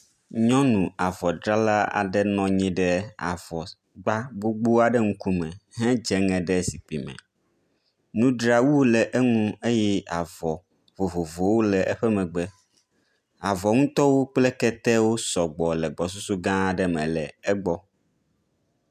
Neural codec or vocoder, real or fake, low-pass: none; real; 14.4 kHz